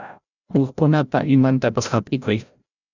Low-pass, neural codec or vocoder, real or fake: 7.2 kHz; codec, 16 kHz, 0.5 kbps, FreqCodec, larger model; fake